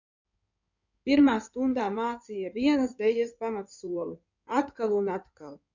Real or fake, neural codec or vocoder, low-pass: fake; codec, 16 kHz in and 24 kHz out, 2.2 kbps, FireRedTTS-2 codec; 7.2 kHz